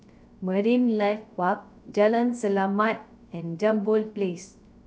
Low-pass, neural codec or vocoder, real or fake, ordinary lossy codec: none; codec, 16 kHz, 0.3 kbps, FocalCodec; fake; none